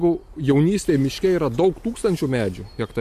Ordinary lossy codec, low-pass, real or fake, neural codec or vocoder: AAC, 96 kbps; 14.4 kHz; real; none